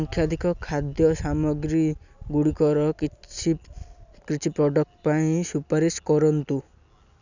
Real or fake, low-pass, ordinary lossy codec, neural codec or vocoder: real; 7.2 kHz; none; none